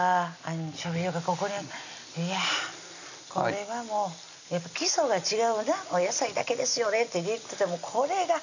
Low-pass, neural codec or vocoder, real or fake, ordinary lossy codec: 7.2 kHz; none; real; none